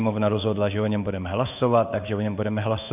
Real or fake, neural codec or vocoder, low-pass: fake; codec, 16 kHz in and 24 kHz out, 1 kbps, XY-Tokenizer; 3.6 kHz